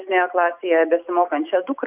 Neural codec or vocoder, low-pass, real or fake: none; 3.6 kHz; real